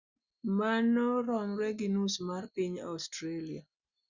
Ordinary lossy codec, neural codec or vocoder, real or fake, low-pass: Opus, 64 kbps; none; real; 7.2 kHz